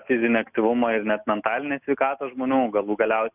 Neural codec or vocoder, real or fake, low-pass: none; real; 3.6 kHz